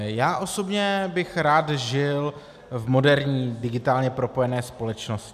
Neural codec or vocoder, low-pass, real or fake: none; 14.4 kHz; real